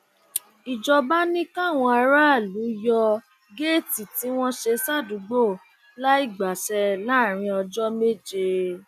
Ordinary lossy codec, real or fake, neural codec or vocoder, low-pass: none; real; none; 14.4 kHz